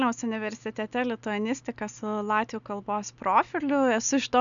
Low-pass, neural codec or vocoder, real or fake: 7.2 kHz; none; real